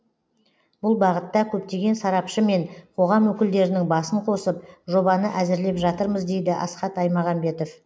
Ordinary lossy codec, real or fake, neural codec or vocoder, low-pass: none; real; none; none